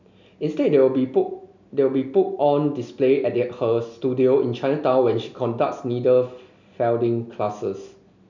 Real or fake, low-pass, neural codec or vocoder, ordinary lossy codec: real; 7.2 kHz; none; none